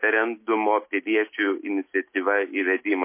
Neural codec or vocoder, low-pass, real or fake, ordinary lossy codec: none; 3.6 kHz; real; MP3, 24 kbps